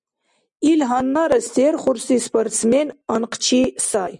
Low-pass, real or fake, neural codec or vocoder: 10.8 kHz; real; none